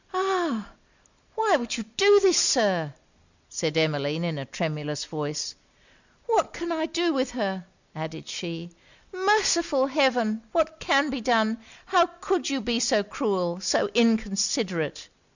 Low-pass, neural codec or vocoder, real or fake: 7.2 kHz; none; real